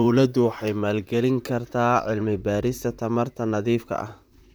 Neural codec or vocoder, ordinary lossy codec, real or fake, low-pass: vocoder, 44.1 kHz, 128 mel bands, Pupu-Vocoder; none; fake; none